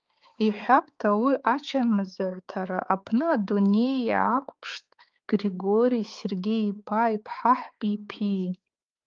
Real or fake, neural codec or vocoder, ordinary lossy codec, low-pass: fake; codec, 16 kHz, 4 kbps, X-Codec, HuBERT features, trained on balanced general audio; Opus, 32 kbps; 7.2 kHz